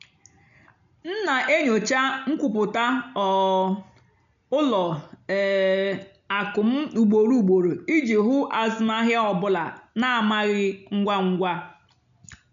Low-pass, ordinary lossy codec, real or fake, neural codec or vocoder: 7.2 kHz; none; real; none